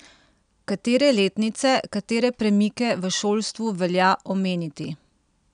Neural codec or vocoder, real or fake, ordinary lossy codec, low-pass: none; real; none; 9.9 kHz